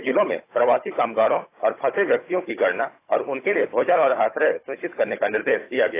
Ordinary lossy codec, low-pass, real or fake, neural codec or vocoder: AAC, 24 kbps; 3.6 kHz; fake; codec, 16 kHz, 16 kbps, FunCodec, trained on Chinese and English, 50 frames a second